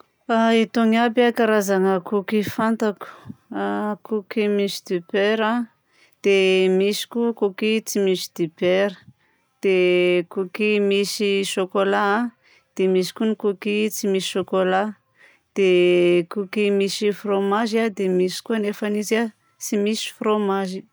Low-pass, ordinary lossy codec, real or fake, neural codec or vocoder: none; none; real; none